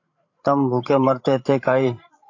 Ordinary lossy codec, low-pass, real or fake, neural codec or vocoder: AAC, 48 kbps; 7.2 kHz; fake; codec, 16 kHz, 8 kbps, FreqCodec, larger model